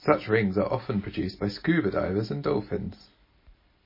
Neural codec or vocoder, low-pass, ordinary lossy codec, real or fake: none; 5.4 kHz; MP3, 24 kbps; real